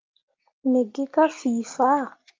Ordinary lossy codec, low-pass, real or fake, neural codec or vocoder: Opus, 24 kbps; 7.2 kHz; real; none